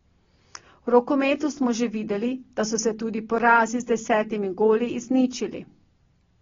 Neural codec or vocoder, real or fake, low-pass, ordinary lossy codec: none; real; 7.2 kHz; AAC, 24 kbps